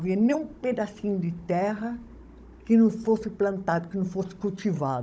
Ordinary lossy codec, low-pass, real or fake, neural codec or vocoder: none; none; fake; codec, 16 kHz, 16 kbps, FunCodec, trained on Chinese and English, 50 frames a second